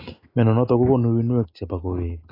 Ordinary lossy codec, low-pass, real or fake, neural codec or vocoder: AAC, 24 kbps; 5.4 kHz; real; none